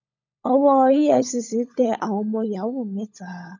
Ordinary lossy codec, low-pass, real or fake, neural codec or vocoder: none; 7.2 kHz; fake; codec, 16 kHz, 16 kbps, FunCodec, trained on LibriTTS, 50 frames a second